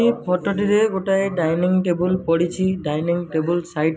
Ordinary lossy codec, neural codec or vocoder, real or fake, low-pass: none; none; real; none